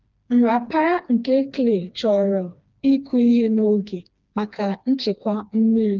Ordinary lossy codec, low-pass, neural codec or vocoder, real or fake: Opus, 24 kbps; 7.2 kHz; codec, 16 kHz, 2 kbps, FreqCodec, smaller model; fake